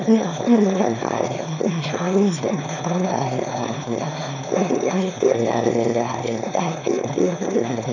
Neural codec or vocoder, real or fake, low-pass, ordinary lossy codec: autoencoder, 22.05 kHz, a latent of 192 numbers a frame, VITS, trained on one speaker; fake; 7.2 kHz; none